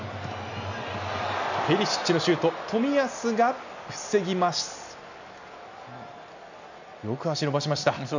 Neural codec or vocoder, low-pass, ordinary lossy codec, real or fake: none; 7.2 kHz; none; real